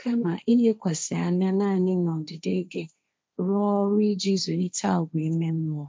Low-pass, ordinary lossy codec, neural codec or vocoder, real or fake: none; none; codec, 16 kHz, 1.1 kbps, Voila-Tokenizer; fake